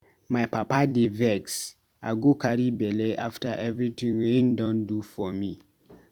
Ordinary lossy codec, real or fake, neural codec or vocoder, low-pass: Opus, 64 kbps; fake; vocoder, 44.1 kHz, 128 mel bands every 256 samples, BigVGAN v2; 19.8 kHz